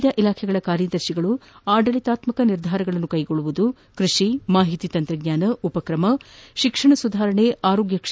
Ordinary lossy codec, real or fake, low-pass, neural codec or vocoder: none; real; none; none